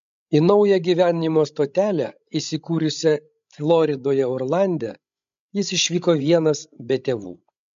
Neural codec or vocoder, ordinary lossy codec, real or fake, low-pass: codec, 16 kHz, 16 kbps, FreqCodec, larger model; MP3, 48 kbps; fake; 7.2 kHz